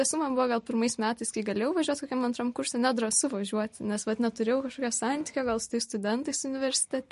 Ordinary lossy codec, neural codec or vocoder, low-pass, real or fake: MP3, 48 kbps; none; 14.4 kHz; real